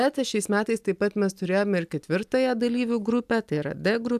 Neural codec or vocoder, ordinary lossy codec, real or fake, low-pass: none; AAC, 96 kbps; real; 14.4 kHz